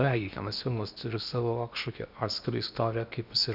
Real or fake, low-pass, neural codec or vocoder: fake; 5.4 kHz; codec, 16 kHz in and 24 kHz out, 0.8 kbps, FocalCodec, streaming, 65536 codes